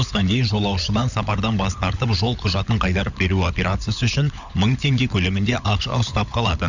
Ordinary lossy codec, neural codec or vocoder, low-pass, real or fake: none; codec, 16 kHz, 16 kbps, FunCodec, trained on LibriTTS, 50 frames a second; 7.2 kHz; fake